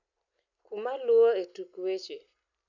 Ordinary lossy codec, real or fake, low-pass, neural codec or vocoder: none; real; 7.2 kHz; none